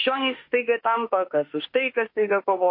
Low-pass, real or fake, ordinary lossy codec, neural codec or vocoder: 5.4 kHz; fake; MP3, 32 kbps; autoencoder, 48 kHz, 32 numbers a frame, DAC-VAE, trained on Japanese speech